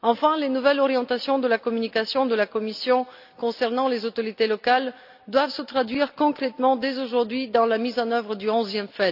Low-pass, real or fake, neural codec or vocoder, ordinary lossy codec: 5.4 kHz; real; none; AAC, 48 kbps